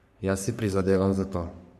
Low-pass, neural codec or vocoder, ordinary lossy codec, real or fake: 14.4 kHz; codec, 44.1 kHz, 3.4 kbps, Pupu-Codec; none; fake